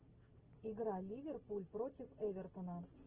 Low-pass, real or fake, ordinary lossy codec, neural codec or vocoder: 3.6 kHz; real; Opus, 16 kbps; none